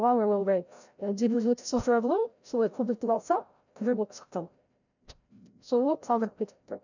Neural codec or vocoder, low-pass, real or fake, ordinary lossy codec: codec, 16 kHz, 0.5 kbps, FreqCodec, larger model; 7.2 kHz; fake; none